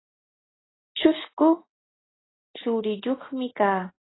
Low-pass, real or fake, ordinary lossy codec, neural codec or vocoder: 7.2 kHz; real; AAC, 16 kbps; none